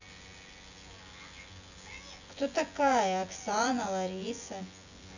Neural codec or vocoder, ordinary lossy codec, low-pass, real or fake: vocoder, 24 kHz, 100 mel bands, Vocos; none; 7.2 kHz; fake